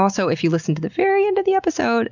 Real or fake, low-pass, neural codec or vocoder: real; 7.2 kHz; none